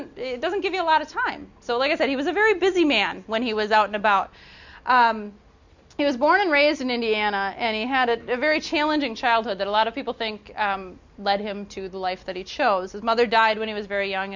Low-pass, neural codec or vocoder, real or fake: 7.2 kHz; none; real